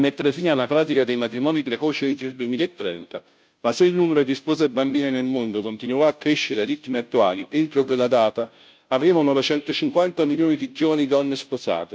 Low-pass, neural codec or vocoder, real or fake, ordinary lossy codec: none; codec, 16 kHz, 0.5 kbps, FunCodec, trained on Chinese and English, 25 frames a second; fake; none